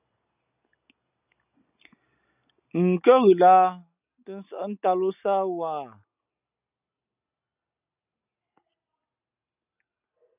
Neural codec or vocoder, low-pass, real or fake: none; 3.6 kHz; real